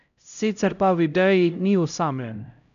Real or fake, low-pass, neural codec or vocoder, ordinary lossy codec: fake; 7.2 kHz; codec, 16 kHz, 0.5 kbps, X-Codec, HuBERT features, trained on LibriSpeech; none